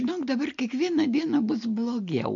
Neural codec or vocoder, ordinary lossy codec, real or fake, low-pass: none; MP3, 48 kbps; real; 7.2 kHz